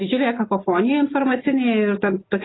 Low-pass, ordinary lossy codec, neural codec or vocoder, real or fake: 7.2 kHz; AAC, 16 kbps; none; real